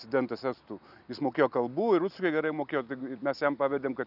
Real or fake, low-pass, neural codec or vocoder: real; 5.4 kHz; none